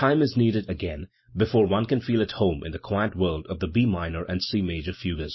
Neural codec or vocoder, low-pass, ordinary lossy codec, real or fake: none; 7.2 kHz; MP3, 24 kbps; real